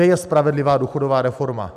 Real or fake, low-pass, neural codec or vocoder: real; 14.4 kHz; none